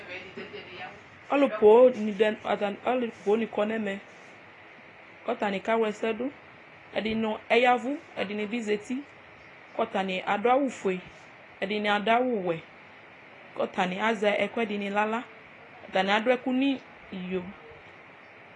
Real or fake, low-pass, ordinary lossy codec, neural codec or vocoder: real; 10.8 kHz; AAC, 32 kbps; none